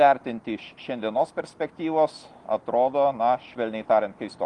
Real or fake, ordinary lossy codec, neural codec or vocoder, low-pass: real; Opus, 24 kbps; none; 10.8 kHz